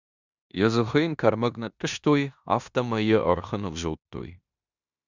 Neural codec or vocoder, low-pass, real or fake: codec, 16 kHz in and 24 kHz out, 0.9 kbps, LongCat-Audio-Codec, four codebook decoder; 7.2 kHz; fake